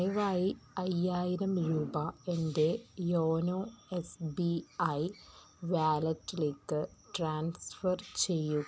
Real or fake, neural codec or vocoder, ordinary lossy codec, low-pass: real; none; none; none